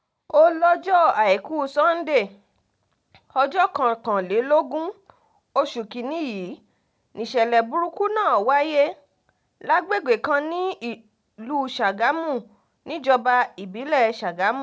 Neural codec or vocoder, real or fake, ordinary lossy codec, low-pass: none; real; none; none